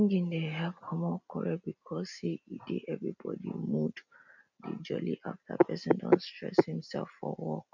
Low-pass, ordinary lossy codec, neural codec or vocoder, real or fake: 7.2 kHz; none; none; real